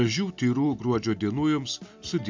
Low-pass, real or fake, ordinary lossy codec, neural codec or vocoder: 7.2 kHz; real; MP3, 64 kbps; none